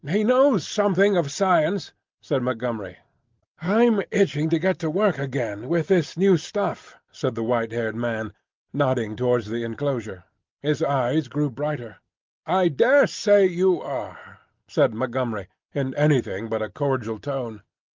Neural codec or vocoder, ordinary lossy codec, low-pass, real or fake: none; Opus, 24 kbps; 7.2 kHz; real